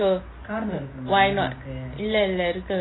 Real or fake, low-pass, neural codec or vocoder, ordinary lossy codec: real; 7.2 kHz; none; AAC, 16 kbps